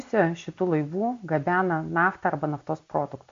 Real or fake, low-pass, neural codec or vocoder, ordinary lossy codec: real; 7.2 kHz; none; MP3, 48 kbps